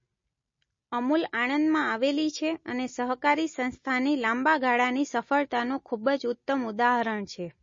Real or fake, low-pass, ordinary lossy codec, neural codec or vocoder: real; 7.2 kHz; MP3, 32 kbps; none